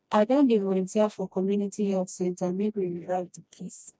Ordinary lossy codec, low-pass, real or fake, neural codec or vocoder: none; none; fake; codec, 16 kHz, 1 kbps, FreqCodec, smaller model